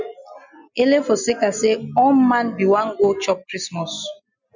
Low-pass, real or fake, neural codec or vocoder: 7.2 kHz; real; none